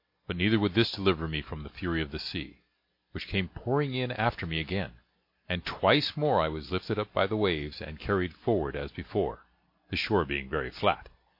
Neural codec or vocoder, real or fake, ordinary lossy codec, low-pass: none; real; MP3, 32 kbps; 5.4 kHz